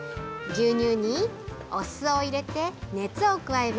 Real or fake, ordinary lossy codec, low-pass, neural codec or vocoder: real; none; none; none